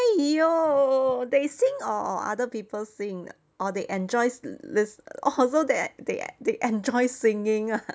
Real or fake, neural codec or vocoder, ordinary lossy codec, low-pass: real; none; none; none